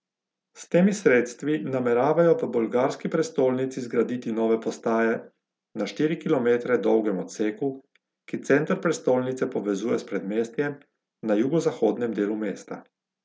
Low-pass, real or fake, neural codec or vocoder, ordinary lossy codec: none; real; none; none